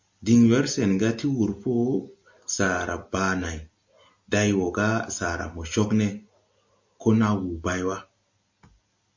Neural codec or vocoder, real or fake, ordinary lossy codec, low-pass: none; real; MP3, 48 kbps; 7.2 kHz